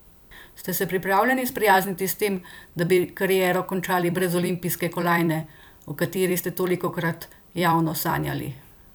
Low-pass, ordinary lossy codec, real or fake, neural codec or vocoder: none; none; fake; vocoder, 44.1 kHz, 128 mel bands every 256 samples, BigVGAN v2